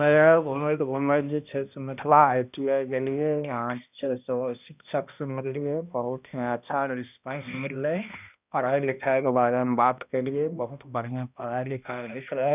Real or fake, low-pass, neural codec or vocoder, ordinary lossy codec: fake; 3.6 kHz; codec, 16 kHz, 1 kbps, X-Codec, HuBERT features, trained on balanced general audio; none